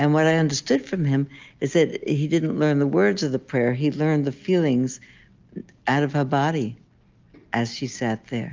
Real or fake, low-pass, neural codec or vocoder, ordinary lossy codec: real; 7.2 kHz; none; Opus, 32 kbps